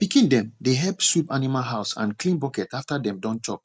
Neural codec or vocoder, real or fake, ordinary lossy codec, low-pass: none; real; none; none